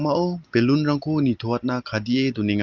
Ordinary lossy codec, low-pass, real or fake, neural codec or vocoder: Opus, 24 kbps; 7.2 kHz; real; none